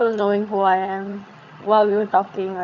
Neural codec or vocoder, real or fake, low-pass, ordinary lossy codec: vocoder, 22.05 kHz, 80 mel bands, HiFi-GAN; fake; 7.2 kHz; none